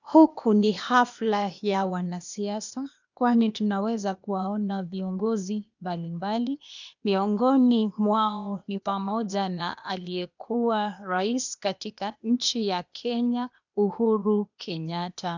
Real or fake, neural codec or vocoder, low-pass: fake; codec, 16 kHz, 0.8 kbps, ZipCodec; 7.2 kHz